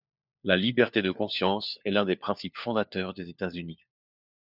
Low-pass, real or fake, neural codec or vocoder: 5.4 kHz; fake; codec, 16 kHz, 4 kbps, FunCodec, trained on LibriTTS, 50 frames a second